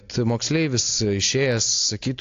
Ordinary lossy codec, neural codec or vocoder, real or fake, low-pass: AAC, 48 kbps; none; real; 7.2 kHz